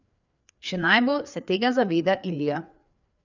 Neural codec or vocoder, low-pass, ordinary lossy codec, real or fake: codec, 16 kHz, 4 kbps, FreqCodec, larger model; 7.2 kHz; none; fake